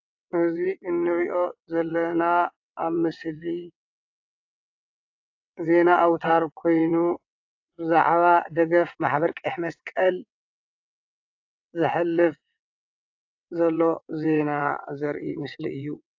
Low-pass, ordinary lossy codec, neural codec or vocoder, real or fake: 7.2 kHz; AAC, 48 kbps; vocoder, 22.05 kHz, 80 mel bands, WaveNeXt; fake